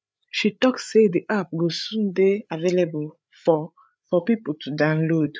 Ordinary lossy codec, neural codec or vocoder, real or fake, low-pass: none; codec, 16 kHz, 8 kbps, FreqCodec, larger model; fake; none